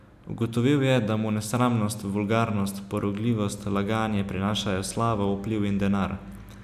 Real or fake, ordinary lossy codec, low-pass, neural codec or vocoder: real; none; 14.4 kHz; none